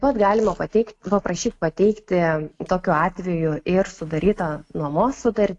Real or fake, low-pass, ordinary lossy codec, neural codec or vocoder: real; 10.8 kHz; AAC, 48 kbps; none